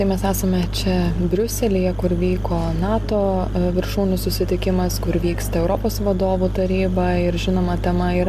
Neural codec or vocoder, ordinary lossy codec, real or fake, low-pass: none; MP3, 96 kbps; real; 14.4 kHz